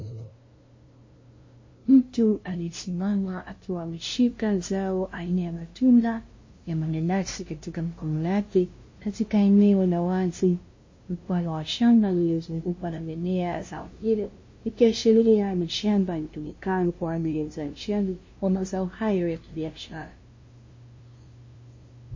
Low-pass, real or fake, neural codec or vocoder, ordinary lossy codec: 7.2 kHz; fake; codec, 16 kHz, 0.5 kbps, FunCodec, trained on LibriTTS, 25 frames a second; MP3, 32 kbps